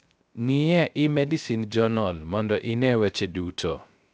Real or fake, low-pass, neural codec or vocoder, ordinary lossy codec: fake; none; codec, 16 kHz, 0.3 kbps, FocalCodec; none